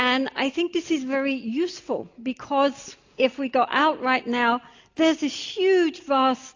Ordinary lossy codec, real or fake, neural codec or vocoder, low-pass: AAC, 48 kbps; real; none; 7.2 kHz